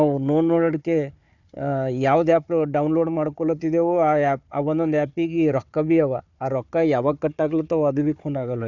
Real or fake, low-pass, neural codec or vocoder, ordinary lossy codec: fake; 7.2 kHz; codec, 16 kHz, 4 kbps, FunCodec, trained on Chinese and English, 50 frames a second; none